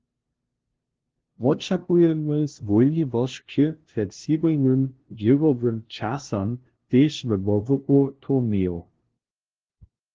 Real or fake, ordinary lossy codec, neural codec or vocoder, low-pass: fake; Opus, 16 kbps; codec, 16 kHz, 0.5 kbps, FunCodec, trained on LibriTTS, 25 frames a second; 7.2 kHz